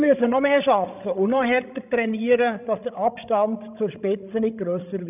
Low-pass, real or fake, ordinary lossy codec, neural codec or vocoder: 3.6 kHz; fake; none; codec, 16 kHz, 16 kbps, FreqCodec, larger model